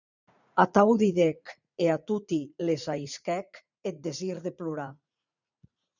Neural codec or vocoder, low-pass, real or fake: vocoder, 44.1 kHz, 80 mel bands, Vocos; 7.2 kHz; fake